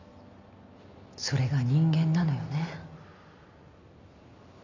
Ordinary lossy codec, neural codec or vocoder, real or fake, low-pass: none; none; real; 7.2 kHz